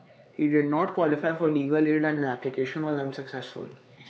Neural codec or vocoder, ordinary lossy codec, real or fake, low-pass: codec, 16 kHz, 4 kbps, X-Codec, HuBERT features, trained on LibriSpeech; none; fake; none